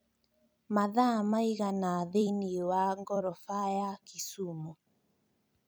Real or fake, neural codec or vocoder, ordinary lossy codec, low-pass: fake; vocoder, 44.1 kHz, 128 mel bands every 256 samples, BigVGAN v2; none; none